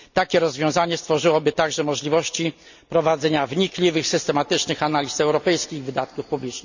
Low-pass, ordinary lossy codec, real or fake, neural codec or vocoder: 7.2 kHz; none; real; none